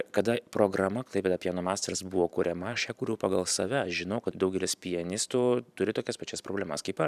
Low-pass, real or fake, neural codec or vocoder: 14.4 kHz; fake; vocoder, 44.1 kHz, 128 mel bands every 512 samples, BigVGAN v2